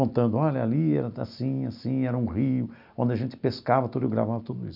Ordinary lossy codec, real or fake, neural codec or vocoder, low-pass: none; real; none; 5.4 kHz